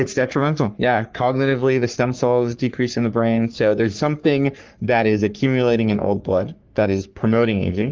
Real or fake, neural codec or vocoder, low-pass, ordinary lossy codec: fake; codec, 44.1 kHz, 3.4 kbps, Pupu-Codec; 7.2 kHz; Opus, 24 kbps